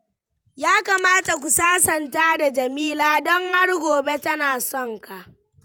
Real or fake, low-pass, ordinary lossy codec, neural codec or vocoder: fake; none; none; vocoder, 48 kHz, 128 mel bands, Vocos